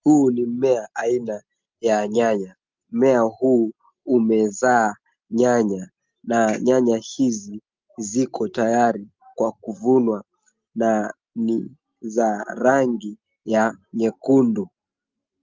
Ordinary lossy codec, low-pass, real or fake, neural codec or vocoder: Opus, 32 kbps; 7.2 kHz; real; none